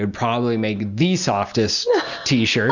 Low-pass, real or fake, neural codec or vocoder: 7.2 kHz; real; none